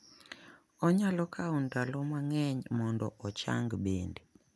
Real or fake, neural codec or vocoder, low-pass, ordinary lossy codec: real; none; none; none